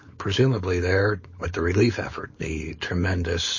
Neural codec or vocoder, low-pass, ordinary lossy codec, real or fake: codec, 16 kHz, 4.8 kbps, FACodec; 7.2 kHz; MP3, 32 kbps; fake